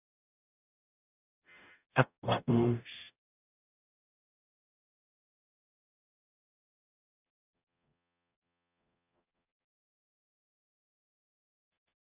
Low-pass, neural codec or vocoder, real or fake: 3.6 kHz; codec, 44.1 kHz, 0.9 kbps, DAC; fake